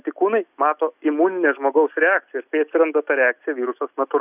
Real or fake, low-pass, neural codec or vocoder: real; 3.6 kHz; none